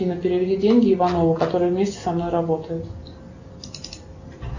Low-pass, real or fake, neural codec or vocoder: 7.2 kHz; real; none